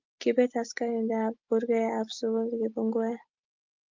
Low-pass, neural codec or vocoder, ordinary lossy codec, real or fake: 7.2 kHz; none; Opus, 24 kbps; real